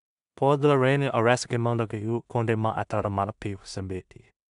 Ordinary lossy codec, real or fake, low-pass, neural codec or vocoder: none; fake; 10.8 kHz; codec, 16 kHz in and 24 kHz out, 0.4 kbps, LongCat-Audio-Codec, two codebook decoder